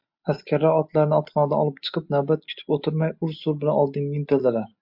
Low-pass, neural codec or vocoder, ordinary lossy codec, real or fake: 5.4 kHz; none; MP3, 48 kbps; real